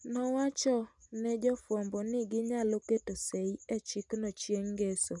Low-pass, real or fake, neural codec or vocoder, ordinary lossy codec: 10.8 kHz; real; none; none